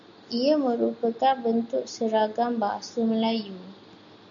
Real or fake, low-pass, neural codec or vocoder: real; 7.2 kHz; none